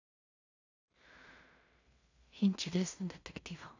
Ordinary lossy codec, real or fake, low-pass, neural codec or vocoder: none; fake; 7.2 kHz; codec, 16 kHz in and 24 kHz out, 0.4 kbps, LongCat-Audio-Codec, two codebook decoder